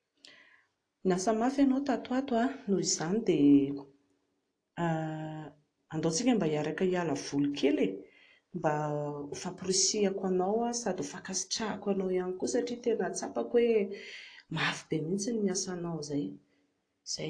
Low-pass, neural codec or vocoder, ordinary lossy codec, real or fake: 9.9 kHz; none; AAC, 48 kbps; real